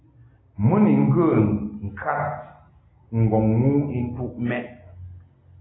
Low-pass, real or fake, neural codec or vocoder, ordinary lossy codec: 7.2 kHz; real; none; AAC, 16 kbps